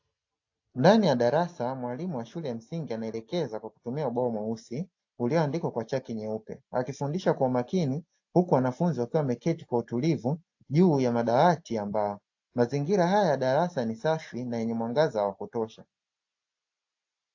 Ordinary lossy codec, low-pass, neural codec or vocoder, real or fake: AAC, 48 kbps; 7.2 kHz; none; real